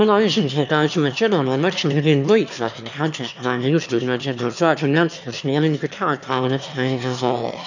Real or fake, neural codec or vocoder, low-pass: fake; autoencoder, 22.05 kHz, a latent of 192 numbers a frame, VITS, trained on one speaker; 7.2 kHz